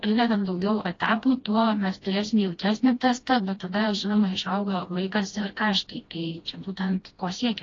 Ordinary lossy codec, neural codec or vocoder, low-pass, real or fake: AAC, 32 kbps; codec, 16 kHz, 1 kbps, FreqCodec, smaller model; 7.2 kHz; fake